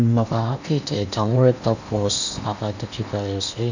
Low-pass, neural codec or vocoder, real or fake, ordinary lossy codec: 7.2 kHz; codec, 16 kHz in and 24 kHz out, 0.8 kbps, FocalCodec, streaming, 65536 codes; fake; none